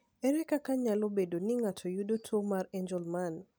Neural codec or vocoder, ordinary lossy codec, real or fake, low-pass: none; none; real; none